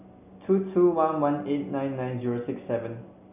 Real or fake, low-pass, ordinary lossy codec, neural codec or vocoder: real; 3.6 kHz; none; none